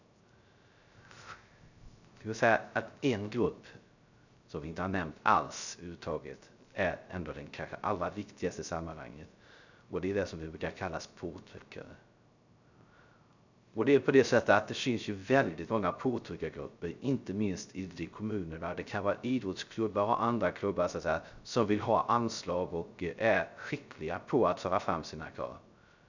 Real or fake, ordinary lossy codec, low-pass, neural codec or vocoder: fake; none; 7.2 kHz; codec, 16 kHz, 0.3 kbps, FocalCodec